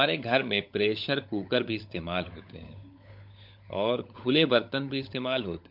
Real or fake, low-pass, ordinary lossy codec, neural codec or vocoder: fake; 5.4 kHz; MP3, 48 kbps; codec, 16 kHz, 16 kbps, FunCodec, trained on LibriTTS, 50 frames a second